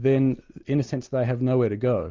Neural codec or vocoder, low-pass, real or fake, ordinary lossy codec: none; 7.2 kHz; real; Opus, 32 kbps